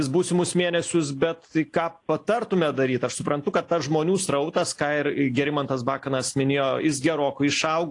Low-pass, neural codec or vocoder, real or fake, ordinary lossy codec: 10.8 kHz; none; real; AAC, 48 kbps